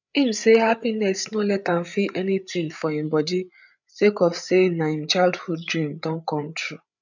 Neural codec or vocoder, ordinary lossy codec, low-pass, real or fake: codec, 16 kHz, 8 kbps, FreqCodec, larger model; none; 7.2 kHz; fake